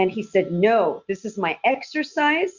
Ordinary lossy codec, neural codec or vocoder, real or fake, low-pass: Opus, 64 kbps; none; real; 7.2 kHz